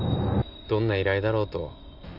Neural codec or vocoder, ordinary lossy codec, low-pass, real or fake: none; none; 5.4 kHz; real